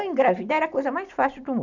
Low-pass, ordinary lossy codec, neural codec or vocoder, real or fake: 7.2 kHz; none; none; real